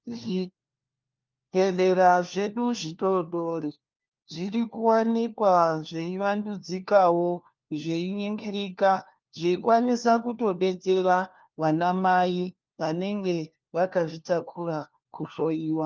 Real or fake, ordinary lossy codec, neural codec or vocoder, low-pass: fake; Opus, 32 kbps; codec, 16 kHz, 1 kbps, FunCodec, trained on LibriTTS, 50 frames a second; 7.2 kHz